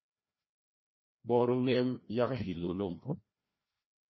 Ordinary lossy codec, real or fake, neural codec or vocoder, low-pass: MP3, 24 kbps; fake; codec, 16 kHz, 1 kbps, FreqCodec, larger model; 7.2 kHz